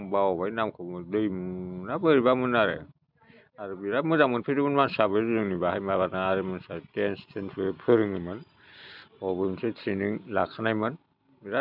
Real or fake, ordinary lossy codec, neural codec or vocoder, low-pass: real; none; none; 5.4 kHz